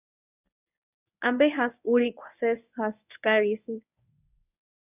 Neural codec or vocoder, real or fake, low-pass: codec, 24 kHz, 0.9 kbps, WavTokenizer, medium speech release version 1; fake; 3.6 kHz